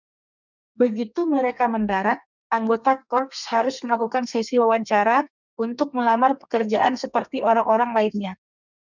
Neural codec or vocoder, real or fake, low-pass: codec, 32 kHz, 1.9 kbps, SNAC; fake; 7.2 kHz